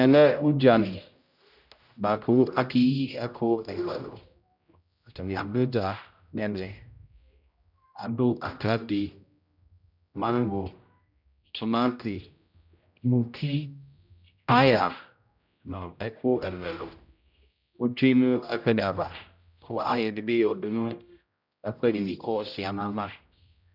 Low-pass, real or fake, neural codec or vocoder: 5.4 kHz; fake; codec, 16 kHz, 0.5 kbps, X-Codec, HuBERT features, trained on general audio